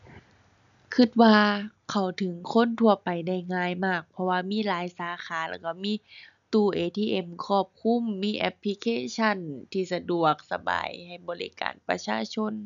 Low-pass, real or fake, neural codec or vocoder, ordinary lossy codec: 7.2 kHz; real; none; none